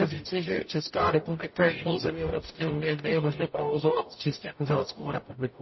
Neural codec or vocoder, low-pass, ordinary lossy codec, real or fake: codec, 44.1 kHz, 0.9 kbps, DAC; 7.2 kHz; MP3, 24 kbps; fake